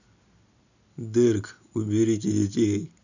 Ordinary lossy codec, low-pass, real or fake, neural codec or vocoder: none; 7.2 kHz; real; none